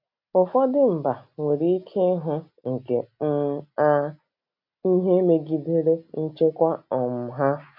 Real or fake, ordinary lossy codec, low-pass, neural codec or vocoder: real; none; 5.4 kHz; none